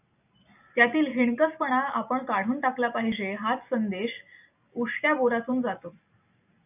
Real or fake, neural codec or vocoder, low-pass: real; none; 3.6 kHz